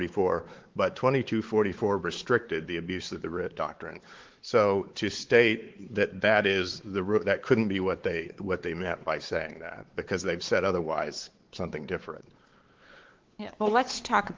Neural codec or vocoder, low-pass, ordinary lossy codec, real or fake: codec, 16 kHz, 4 kbps, X-Codec, WavLM features, trained on Multilingual LibriSpeech; 7.2 kHz; Opus, 16 kbps; fake